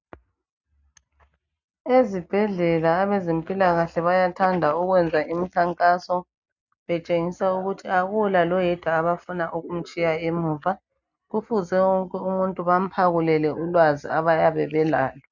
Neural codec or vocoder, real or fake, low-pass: none; real; 7.2 kHz